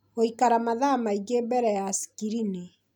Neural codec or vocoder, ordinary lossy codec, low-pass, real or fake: none; none; none; real